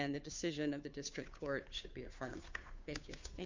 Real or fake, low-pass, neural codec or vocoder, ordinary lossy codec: fake; 7.2 kHz; codec, 16 kHz, 2 kbps, FunCodec, trained on Chinese and English, 25 frames a second; MP3, 64 kbps